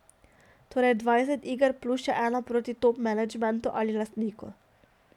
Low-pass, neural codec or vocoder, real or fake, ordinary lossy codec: 19.8 kHz; none; real; none